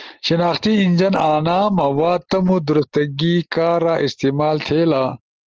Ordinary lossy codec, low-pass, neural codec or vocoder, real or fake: Opus, 16 kbps; 7.2 kHz; none; real